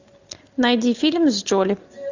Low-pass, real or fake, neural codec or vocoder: 7.2 kHz; real; none